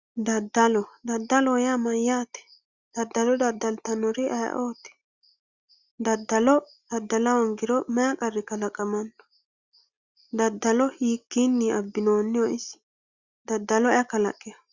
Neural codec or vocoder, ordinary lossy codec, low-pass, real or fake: none; Opus, 64 kbps; 7.2 kHz; real